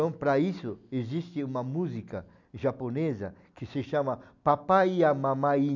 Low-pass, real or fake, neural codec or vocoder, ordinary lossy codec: 7.2 kHz; real; none; none